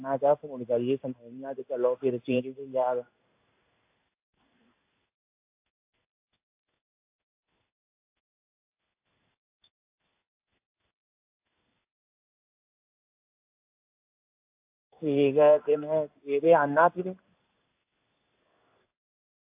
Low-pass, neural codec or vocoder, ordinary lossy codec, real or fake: 3.6 kHz; codec, 16 kHz in and 24 kHz out, 1 kbps, XY-Tokenizer; AAC, 32 kbps; fake